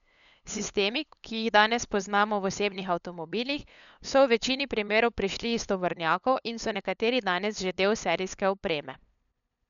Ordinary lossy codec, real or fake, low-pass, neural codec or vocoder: none; fake; 7.2 kHz; codec, 16 kHz, 8 kbps, FunCodec, trained on LibriTTS, 25 frames a second